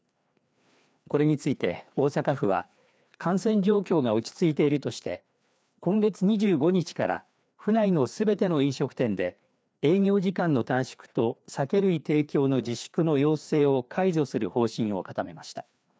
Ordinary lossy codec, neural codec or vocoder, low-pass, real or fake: none; codec, 16 kHz, 2 kbps, FreqCodec, larger model; none; fake